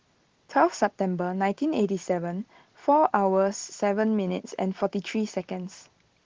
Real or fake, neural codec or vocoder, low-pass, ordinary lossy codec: real; none; 7.2 kHz; Opus, 16 kbps